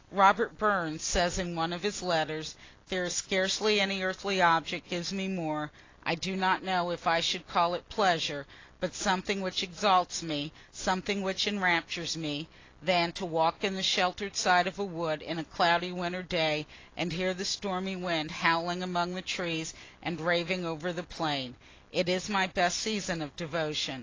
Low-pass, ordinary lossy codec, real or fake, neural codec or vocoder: 7.2 kHz; AAC, 32 kbps; real; none